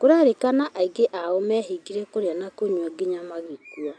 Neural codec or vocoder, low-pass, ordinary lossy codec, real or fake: none; 9.9 kHz; AAC, 64 kbps; real